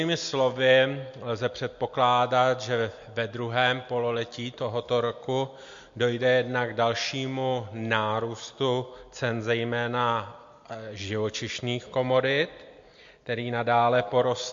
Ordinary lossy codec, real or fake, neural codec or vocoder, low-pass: MP3, 48 kbps; real; none; 7.2 kHz